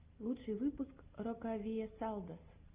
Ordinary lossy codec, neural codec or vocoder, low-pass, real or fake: Opus, 32 kbps; none; 3.6 kHz; real